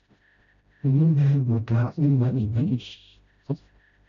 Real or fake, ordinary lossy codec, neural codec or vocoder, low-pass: fake; AAC, 48 kbps; codec, 16 kHz, 0.5 kbps, FreqCodec, smaller model; 7.2 kHz